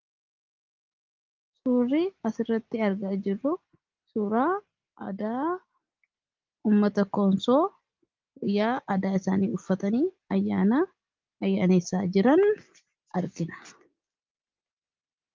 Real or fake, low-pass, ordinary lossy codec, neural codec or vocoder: real; 7.2 kHz; Opus, 32 kbps; none